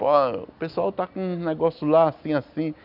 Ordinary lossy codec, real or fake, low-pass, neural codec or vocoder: none; real; 5.4 kHz; none